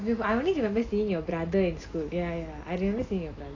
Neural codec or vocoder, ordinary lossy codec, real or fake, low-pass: none; AAC, 32 kbps; real; 7.2 kHz